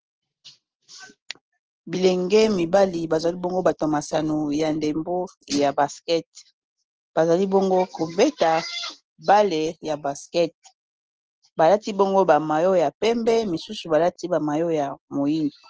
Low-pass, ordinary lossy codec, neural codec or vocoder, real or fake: 7.2 kHz; Opus, 16 kbps; none; real